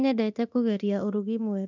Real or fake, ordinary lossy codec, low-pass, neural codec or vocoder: fake; none; 7.2 kHz; codec, 24 kHz, 0.9 kbps, DualCodec